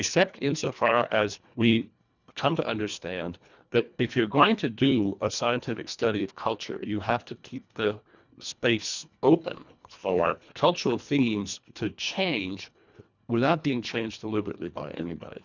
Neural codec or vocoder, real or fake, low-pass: codec, 24 kHz, 1.5 kbps, HILCodec; fake; 7.2 kHz